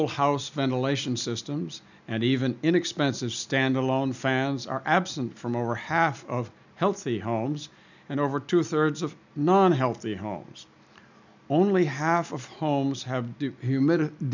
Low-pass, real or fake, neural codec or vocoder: 7.2 kHz; real; none